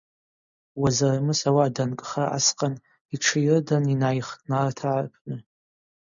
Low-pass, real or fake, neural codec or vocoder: 7.2 kHz; real; none